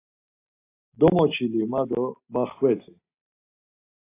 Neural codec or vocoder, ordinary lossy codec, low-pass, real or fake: none; AAC, 24 kbps; 3.6 kHz; real